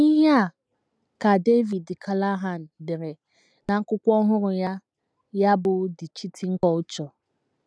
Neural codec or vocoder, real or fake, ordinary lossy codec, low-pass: none; real; none; none